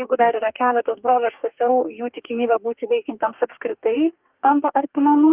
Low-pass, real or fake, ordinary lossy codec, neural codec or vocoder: 3.6 kHz; fake; Opus, 24 kbps; codec, 44.1 kHz, 2.6 kbps, DAC